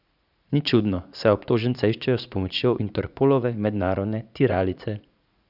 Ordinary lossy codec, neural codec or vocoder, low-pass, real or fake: none; vocoder, 44.1 kHz, 80 mel bands, Vocos; 5.4 kHz; fake